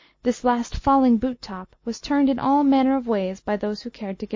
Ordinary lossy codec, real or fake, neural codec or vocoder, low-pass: MP3, 32 kbps; real; none; 7.2 kHz